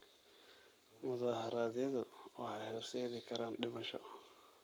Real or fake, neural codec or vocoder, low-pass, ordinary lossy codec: fake; codec, 44.1 kHz, 7.8 kbps, Pupu-Codec; none; none